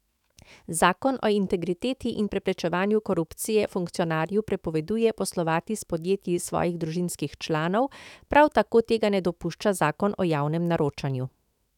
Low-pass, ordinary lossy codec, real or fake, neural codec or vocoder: 19.8 kHz; none; fake; autoencoder, 48 kHz, 128 numbers a frame, DAC-VAE, trained on Japanese speech